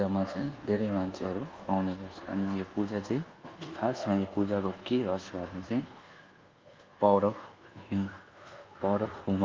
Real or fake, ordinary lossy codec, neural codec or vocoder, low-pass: fake; Opus, 32 kbps; codec, 24 kHz, 1.2 kbps, DualCodec; 7.2 kHz